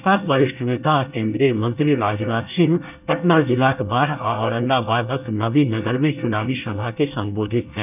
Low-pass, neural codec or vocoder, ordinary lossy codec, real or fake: 3.6 kHz; codec, 24 kHz, 1 kbps, SNAC; none; fake